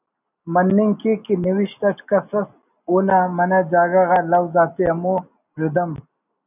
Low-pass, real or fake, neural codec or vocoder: 3.6 kHz; real; none